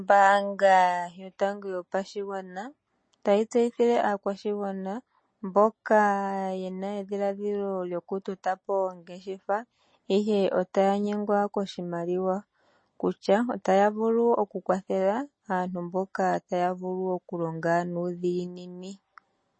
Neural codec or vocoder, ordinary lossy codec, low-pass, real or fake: none; MP3, 32 kbps; 9.9 kHz; real